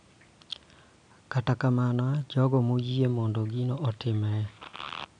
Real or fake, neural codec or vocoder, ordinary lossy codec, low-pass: real; none; none; 9.9 kHz